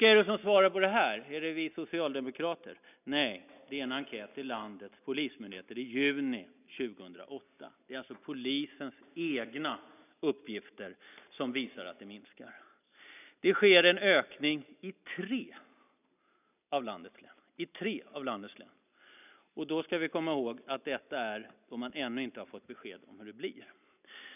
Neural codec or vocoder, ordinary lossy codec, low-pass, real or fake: none; none; 3.6 kHz; real